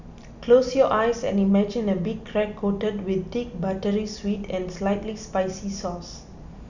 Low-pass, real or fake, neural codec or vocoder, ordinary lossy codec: 7.2 kHz; real; none; none